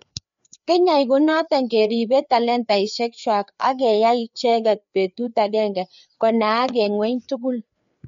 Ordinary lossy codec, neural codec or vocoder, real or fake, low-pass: MP3, 48 kbps; codec, 16 kHz, 4 kbps, FreqCodec, larger model; fake; 7.2 kHz